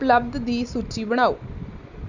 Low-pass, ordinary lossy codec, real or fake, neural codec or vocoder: 7.2 kHz; none; real; none